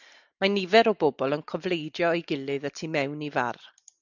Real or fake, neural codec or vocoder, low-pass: real; none; 7.2 kHz